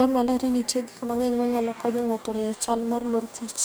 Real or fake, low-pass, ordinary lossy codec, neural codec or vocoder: fake; none; none; codec, 44.1 kHz, 2.6 kbps, DAC